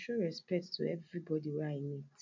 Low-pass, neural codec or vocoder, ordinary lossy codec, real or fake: 7.2 kHz; none; MP3, 64 kbps; real